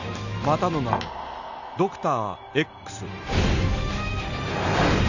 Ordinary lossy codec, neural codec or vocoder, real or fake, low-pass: none; none; real; 7.2 kHz